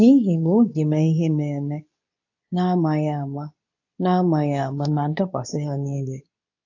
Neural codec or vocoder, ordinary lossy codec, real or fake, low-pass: codec, 24 kHz, 0.9 kbps, WavTokenizer, medium speech release version 2; AAC, 48 kbps; fake; 7.2 kHz